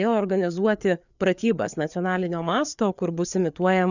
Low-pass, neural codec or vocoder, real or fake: 7.2 kHz; codec, 16 kHz, 4 kbps, FreqCodec, larger model; fake